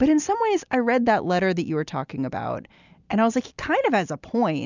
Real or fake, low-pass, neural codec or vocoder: real; 7.2 kHz; none